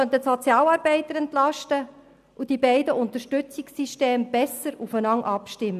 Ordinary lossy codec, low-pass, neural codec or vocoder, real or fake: none; 14.4 kHz; none; real